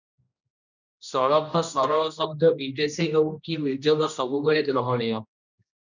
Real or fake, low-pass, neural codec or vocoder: fake; 7.2 kHz; codec, 16 kHz, 1 kbps, X-Codec, HuBERT features, trained on general audio